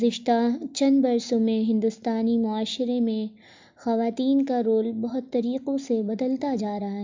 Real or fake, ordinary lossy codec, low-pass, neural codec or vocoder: real; MP3, 64 kbps; 7.2 kHz; none